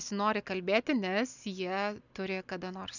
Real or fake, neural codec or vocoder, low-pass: real; none; 7.2 kHz